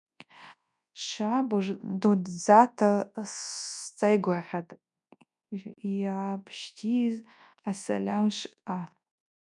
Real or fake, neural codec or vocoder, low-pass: fake; codec, 24 kHz, 0.9 kbps, WavTokenizer, large speech release; 10.8 kHz